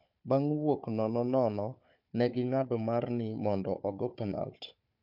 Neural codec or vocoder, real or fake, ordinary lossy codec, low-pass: codec, 16 kHz, 4 kbps, FunCodec, trained on Chinese and English, 50 frames a second; fake; MP3, 48 kbps; 5.4 kHz